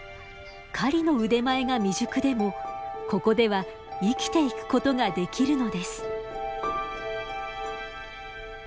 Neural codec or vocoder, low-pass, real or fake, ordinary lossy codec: none; none; real; none